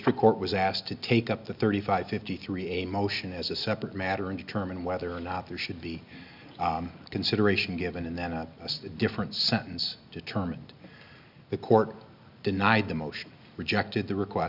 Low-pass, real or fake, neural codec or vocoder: 5.4 kHz; real; none